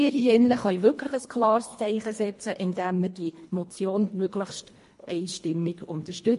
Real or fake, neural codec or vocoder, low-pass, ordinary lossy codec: fake; codec, 24 kHz, 1.5 kbps, HILCodec; 10.8 kHz; MP3, 48 kbps